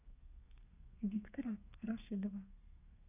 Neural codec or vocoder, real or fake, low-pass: codec, 32 kHz, 1.9 kbps, SNAC; fake; 3.6 kHz